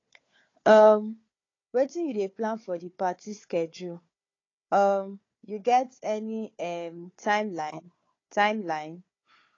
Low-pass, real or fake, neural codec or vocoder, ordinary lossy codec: 7.2 kHz; fake; codec, 16 kHz, 4 kbps, FunCodec, trained on Chinese and English, 50 frames a second; AAC, 32 kbps